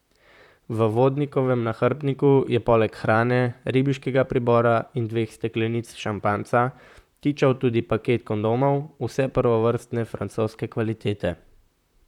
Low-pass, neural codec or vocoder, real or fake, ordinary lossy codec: 19.8 kHz; vocoder, 44.1 kHz, 128 mel bands, Pupu-Vocoder; fake; none